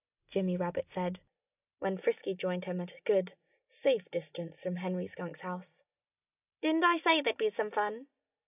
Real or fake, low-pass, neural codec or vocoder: real; 3.6 kHz; none